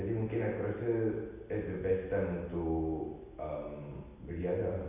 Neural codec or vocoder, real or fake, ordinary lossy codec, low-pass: none; real; none; 3.6 kHz